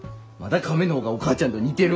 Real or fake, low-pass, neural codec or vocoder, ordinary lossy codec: real; none; none; none